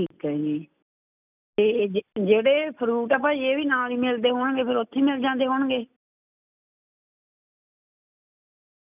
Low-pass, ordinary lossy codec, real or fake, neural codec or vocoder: 3.6 kHz; none; real; none